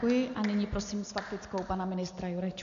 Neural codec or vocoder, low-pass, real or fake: none; 7.2 kHz; real